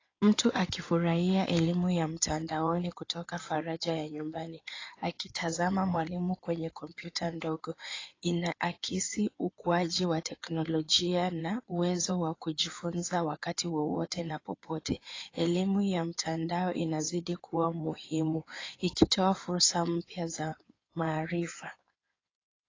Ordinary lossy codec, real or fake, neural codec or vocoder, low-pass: AAC, 32 kbps; fake; codec, 16 kHz in and 24 kHz out, 2.2 kbps, FireRedTTS-2 codec; 7.2 kHz